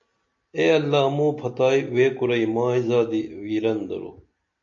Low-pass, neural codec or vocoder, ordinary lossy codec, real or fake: 7.2 kHz; none; AAC, 64 kbps; real